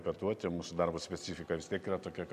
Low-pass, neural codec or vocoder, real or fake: 14.4 kHz; none; real